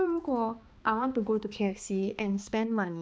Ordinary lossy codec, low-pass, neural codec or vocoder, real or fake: none; none; codec, 16 kHz, 2 kbps, X-Codec, HuBERT features, trained on balanced general audio; fake